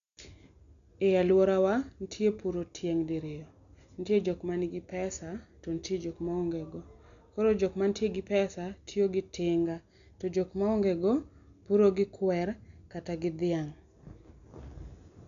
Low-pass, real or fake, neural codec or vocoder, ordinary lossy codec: 7.2 kHz; real; none; none